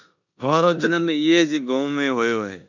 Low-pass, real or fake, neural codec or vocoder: 7.2 kHz; fake; codec, 16 kHz in and 24 kHz out, 0.9 kbps, LongCat-Audio-Codec, four codebook decoder